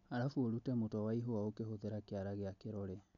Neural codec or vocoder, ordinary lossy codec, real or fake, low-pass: none; none; real; 7.2 kHz